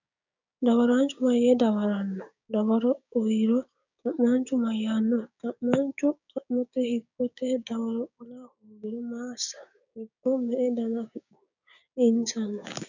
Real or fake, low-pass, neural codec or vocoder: fake; 7.2 kHz; codec, 16 kHz, 6 kbps, DAC